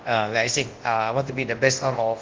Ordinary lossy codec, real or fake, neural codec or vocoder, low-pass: Opus, 16 kbps; fake; codec, 24 kHz, 0.9 kbps, WavTokenizer, large speech release; 7.2 kHz